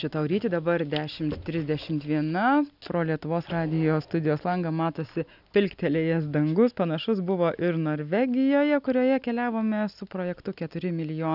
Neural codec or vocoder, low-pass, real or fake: none; 5.4 kHz; real